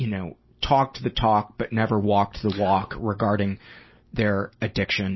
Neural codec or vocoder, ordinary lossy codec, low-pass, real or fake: none; MP3, 24 kbps; 7.2 kHz; real